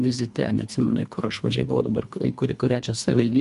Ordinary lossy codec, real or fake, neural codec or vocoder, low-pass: MP3, 96 kbps; fake; codec, 24 kHz, 1.5 kbps, HILCodec; 10.8 kHz